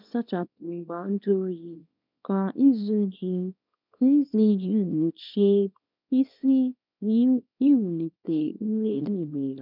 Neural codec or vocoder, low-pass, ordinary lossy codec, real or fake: codec, 24 kHz, 0.9 kbps, WavTokenizer, small release; 5.4 kHz; none; fake